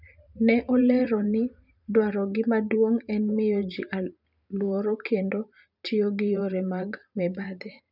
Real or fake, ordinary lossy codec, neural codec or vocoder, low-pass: fake; none; vocoder, 44.1 kHz, 128 mel bands every 512 samples, BigVGAN v2; 5.4 kHz